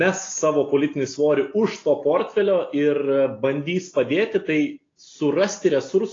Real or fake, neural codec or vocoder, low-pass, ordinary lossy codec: real; none; 7.2 kHz; AAC, 32 kbps